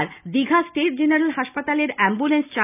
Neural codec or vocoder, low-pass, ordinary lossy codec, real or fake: none; 3.6 kHz; none; real